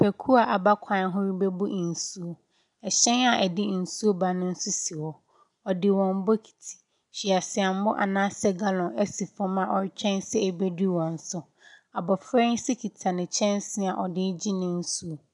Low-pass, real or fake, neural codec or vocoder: 10.8 kHz; real; none